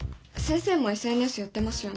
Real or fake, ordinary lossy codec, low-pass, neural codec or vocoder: real; none; none; none